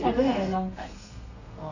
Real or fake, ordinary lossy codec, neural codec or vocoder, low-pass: fake; none; codec, 32 kHz, 1.9 kbps, SNAC; 7.2 kHz